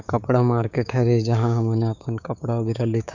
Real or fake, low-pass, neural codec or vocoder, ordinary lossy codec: fake; 7.2 kHz; codec, 16 kHz, 6 kbps, DAC; none